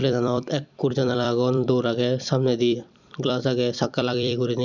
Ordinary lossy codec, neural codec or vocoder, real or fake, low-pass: none; vocoder, 44.1 kHz, 128 mel bands every 256 samples, BigVGAN v2; fake; 7.2 kHz